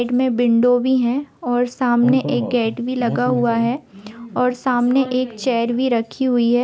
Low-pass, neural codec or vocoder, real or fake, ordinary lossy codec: none; none; real; none